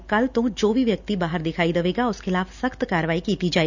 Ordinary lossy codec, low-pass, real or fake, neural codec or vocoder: none; 7.2 kHz; real; none